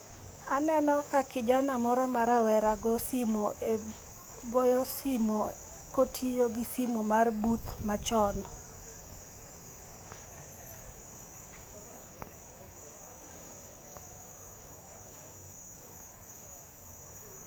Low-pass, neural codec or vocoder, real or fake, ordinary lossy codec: none; codec, 44.1 kHz, 7.8 kbps, DAC; fake; none